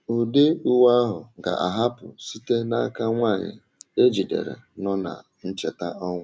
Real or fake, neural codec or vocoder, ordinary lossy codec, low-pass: real; none; none; none